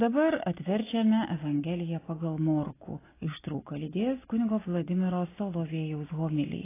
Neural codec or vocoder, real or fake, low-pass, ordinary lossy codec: none; real; 3.6 kHz; AAC, 16 kbps